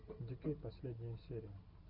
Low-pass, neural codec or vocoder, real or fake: 5.4 kHz; none; real